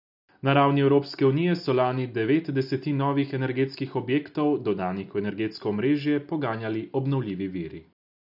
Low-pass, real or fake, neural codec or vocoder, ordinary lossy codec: 5.4 kHz; real; none; none